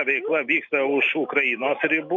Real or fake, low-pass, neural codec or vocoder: real; 7.2 kHz; none